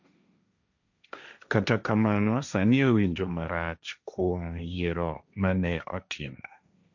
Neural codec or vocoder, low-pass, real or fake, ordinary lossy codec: codec, 16 kHz, 1.1 kbps, Voila-Tokenizer; 7.2 kHz; fake; none